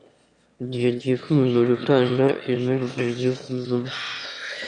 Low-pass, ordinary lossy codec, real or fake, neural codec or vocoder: 9.9 kHz; Opus, 64 kbps; fake; autoencoder, 22.05 kHz, a latent of 192 numbers a frame, VITS, trained on one speaker